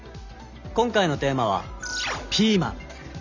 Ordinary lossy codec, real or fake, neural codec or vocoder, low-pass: none; real; none; 7.2 kHz